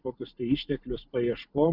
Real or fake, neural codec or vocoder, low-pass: real; none; 5.4 kHz